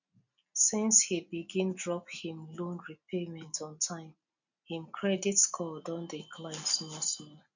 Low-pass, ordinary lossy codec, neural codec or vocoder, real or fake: 7.2 kHz; none; none; real